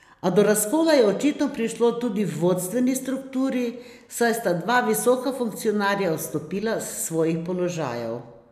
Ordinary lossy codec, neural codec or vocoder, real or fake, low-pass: none; none; real; 14.4 kHz